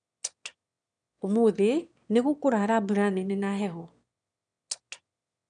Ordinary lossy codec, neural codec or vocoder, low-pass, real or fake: none; autoencoder, 22.05 kHz, a latent of 192 numbers a frame, VITS, trained on one speaker; 9.9 kHz; fake